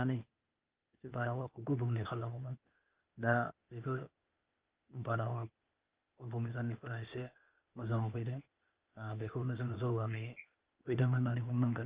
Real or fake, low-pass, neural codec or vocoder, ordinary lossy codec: fake; 3.6 kHz; codec, 16 kHz, 0.8 kbps, ZipCodec; Opus, 32 kbps